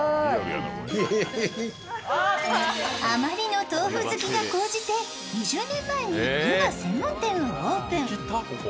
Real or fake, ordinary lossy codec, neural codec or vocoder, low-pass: real; none; none; none